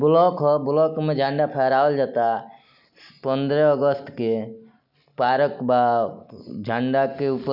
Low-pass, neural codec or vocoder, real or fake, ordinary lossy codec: 5.4 kHz; none; real; none